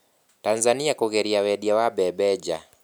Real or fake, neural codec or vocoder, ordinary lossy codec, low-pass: real; none; none; none